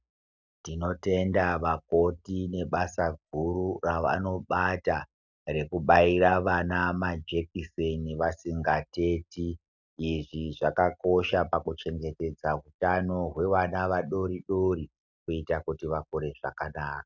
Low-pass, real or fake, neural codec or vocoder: 7.2 kHz; real; none